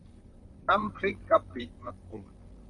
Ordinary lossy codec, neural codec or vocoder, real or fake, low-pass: MP3, 96 kbps; vocoder, 44.1 kHz, 128 mel bands, Pupu-Vocoder; fake; 10.8 kHz